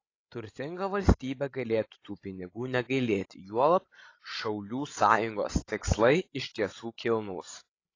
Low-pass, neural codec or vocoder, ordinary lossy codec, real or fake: 7.2 kHz; codec, 16 kHz, 16 kbps, FreqCodec, larger model; AAC, 32 kbps; fake